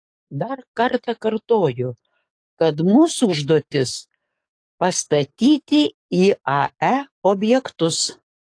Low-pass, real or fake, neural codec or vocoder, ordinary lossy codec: 9.9 kHz; fake; codec, 44.1 kHz, 7.8 kbps, DAC; AAC, 48 kbps